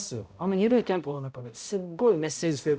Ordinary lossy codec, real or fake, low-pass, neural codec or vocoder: none; fake; none; codec, 16 kHz, 0.5 kbps, X-Codec, HuBERT features, trained on balanced general audio